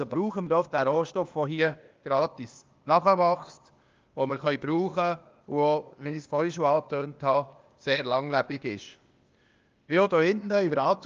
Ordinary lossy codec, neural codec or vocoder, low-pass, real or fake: Opus, 24 kbps; codec, 16 kHz, 0.8 kbps, ZipCodec; 7.2 kHz; fake